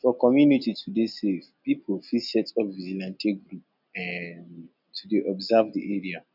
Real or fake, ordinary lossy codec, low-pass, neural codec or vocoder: real; none; 5.4 kHz; none